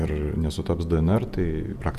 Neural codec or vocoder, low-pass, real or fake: vocoder, 48 kHz, 128 mel bands, Vocos; 14.4 kHz; fake